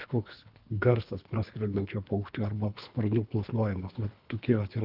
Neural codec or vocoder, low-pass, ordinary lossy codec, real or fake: codec, 24 kHz, 3 kbps, HILCodec; 5.4 kHz; Opus, 24 kbps; fake